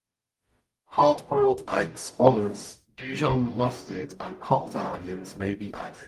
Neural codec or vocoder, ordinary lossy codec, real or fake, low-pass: codec, 44.1 kHz, 0.9 kbps, DAC; Opus, 32 kbps; fake; 14.4 kHz